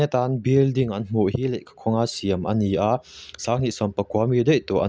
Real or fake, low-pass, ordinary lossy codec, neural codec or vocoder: real; none; none; none